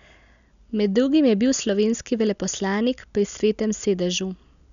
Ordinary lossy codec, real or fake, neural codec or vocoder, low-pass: none; real; none; 7.2 kHz